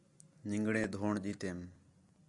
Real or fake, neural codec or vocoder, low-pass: real; none; 10.8 kHz